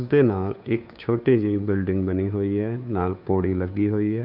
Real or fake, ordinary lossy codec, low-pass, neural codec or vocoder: fake; none; 5.4 kHz; codec, 16 kHz, 2 kbps, FunCodec, trained on Chinese and English, 25 frames a second